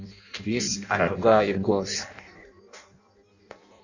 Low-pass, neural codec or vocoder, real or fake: 7.2 kHz; codec, 16 kHz in and 24 kHz out, 0.6 kbps, FireRedTTS-2 codec; fake